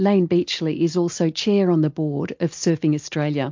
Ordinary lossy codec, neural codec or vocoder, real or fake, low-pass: MP3, 48 kbps; none; real; 7.2 kHz